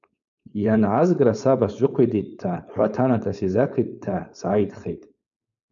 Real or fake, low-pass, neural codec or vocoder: fake; 7.2 kHz; codec, 16 kHz, 4.8 kbps, FACodec